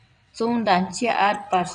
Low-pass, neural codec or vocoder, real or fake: 9.9 kHz; vocoder, 22.05 kHz, 80 mel bands, WaveNeXt; fake